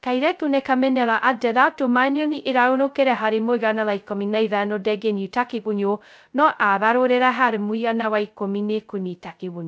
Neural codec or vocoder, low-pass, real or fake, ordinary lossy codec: codec, 16 kHz, 0.2 kbps, FocalCodec; none; fake; none